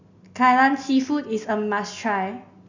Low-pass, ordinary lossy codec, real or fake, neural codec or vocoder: 7.2 kHz; none; real; none